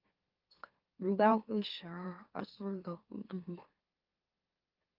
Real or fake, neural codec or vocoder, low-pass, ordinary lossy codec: fake; autoencoder, 44.1 kHz, a latent of 192 numbers a frame, MeloTTS; 5.4 kHz; Opus, 32 kbps